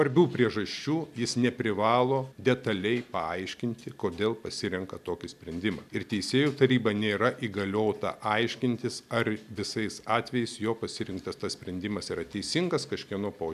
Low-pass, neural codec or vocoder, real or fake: 14.4 kHz; none; real